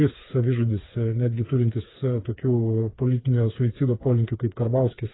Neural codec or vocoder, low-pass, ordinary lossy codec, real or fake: codec, 16 kHz, 4 kbps, FreqCodec, smaller model; 7.2 kHz; AAC, 16 kbps; fake